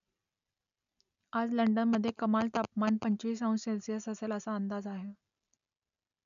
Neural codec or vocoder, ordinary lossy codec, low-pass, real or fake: none; none; 7.2 kHz; real